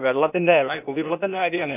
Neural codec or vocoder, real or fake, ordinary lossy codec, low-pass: codec, 16 kHz, 0.8 kbps, ZipCodec; fake; none; 3.6 kHz